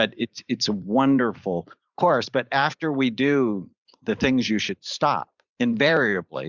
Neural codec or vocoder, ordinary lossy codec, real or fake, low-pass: vocoder, 44.1 kHz, 128 mel bands every 512 samples, BigVGAN v2; Opus, 64 kbps; fake; 7.2 kHz